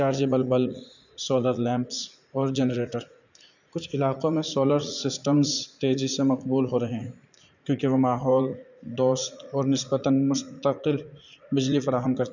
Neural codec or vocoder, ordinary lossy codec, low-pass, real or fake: vocoder, 44.1 kHz, 80 mel bands, Vocos; none; 7.2 kHz; fake